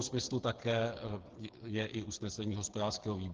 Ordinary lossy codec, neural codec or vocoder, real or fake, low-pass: Opus, 16 kbps; codec, 16 kHz, 8 kbps, FreqCodec, smaller model; fake; 7.2 kHz